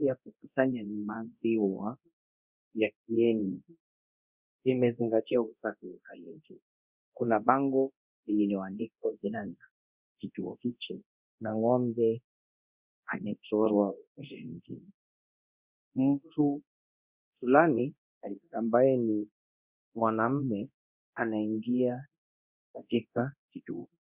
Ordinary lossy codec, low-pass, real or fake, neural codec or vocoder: Opus, 64 kbps; 3.6 kHz; fake; codec, 24 kHz, 0.9 kbps, DualCodec